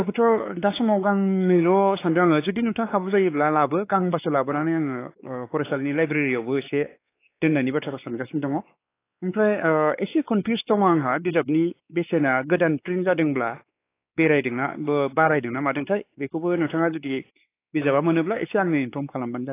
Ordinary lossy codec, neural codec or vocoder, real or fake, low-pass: AAC, 24 kbps; codec, 16 kHz, 4 kbps, X-Codec, WavLM features, trained on Multilingual LibriSpeech; fake; 3.6 kHz